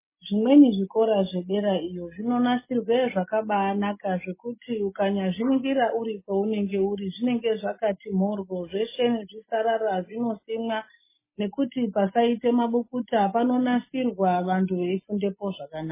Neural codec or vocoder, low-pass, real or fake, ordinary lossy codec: none; 3.6 kHz; real; MP3, 16 kbps